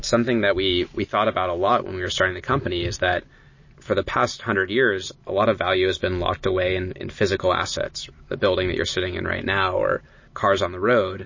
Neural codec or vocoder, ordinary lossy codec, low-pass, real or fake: none; MP3, 32 kbps; 7.2 kHz; real